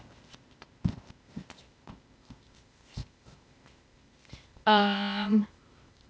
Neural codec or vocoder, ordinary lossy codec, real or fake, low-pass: codec, 16 kHz, 0.8 kbps, ZipCodec; none; fake; none